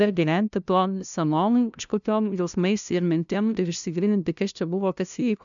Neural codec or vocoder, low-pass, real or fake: codec, 16 kHz, 0.5 kbps, FunCodec, trained on LibriTTS, 25 frames a second; 7.2 kHz; fake